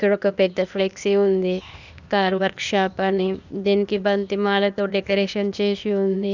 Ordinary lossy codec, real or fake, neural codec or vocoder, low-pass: none; fake; codec, 16 kHz, 0.8 kbps, ZipCodec; 7.2 kHz